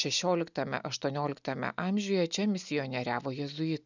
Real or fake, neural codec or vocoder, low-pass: real; none; 7.2 kHz